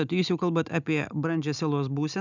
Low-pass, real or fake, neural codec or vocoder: 7.2 kHz; real; none